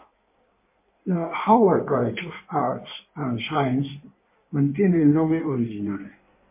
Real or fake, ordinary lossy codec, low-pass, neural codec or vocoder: fake; MP3, 24 kbps; 3.6 kHz; codec, 16 kHz in and 24 kHz out, 1.1 kbps, FireRedTTS-2 codec